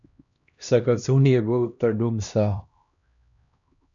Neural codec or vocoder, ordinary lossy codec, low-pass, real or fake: codec, 16 kHz, 1 kbps, X-Codec, HuBERT features, trained on LibriSpeech; MP3, 96 kbps; 7.2 kHz; fake